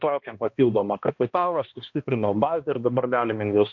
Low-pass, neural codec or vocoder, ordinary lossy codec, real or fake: 7.2 kHz; codec, 16 kHz, 1 kbps, X-Codec, HuBERT features, trained on balanced general audio; MP3, 48 kbps; fake